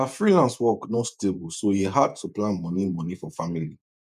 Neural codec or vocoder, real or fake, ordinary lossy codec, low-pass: vocoder, 48 kHz, 128 mel bands, Vocos; fake; none; 14.4 kHz